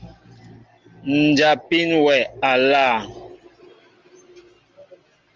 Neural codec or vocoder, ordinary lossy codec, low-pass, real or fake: none; Opus, 32 kbps; 7.2 kHz; real